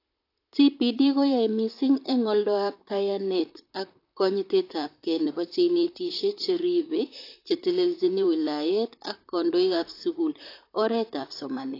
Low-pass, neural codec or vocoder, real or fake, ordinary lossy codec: 5.4 kHz; vocoder, 44.1 kHz, 128 mel bands, Pupu-Vocoder; fake; AAC, 32 kbps